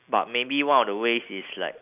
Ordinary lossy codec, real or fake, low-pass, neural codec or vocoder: none; real; 3.6 kHz; none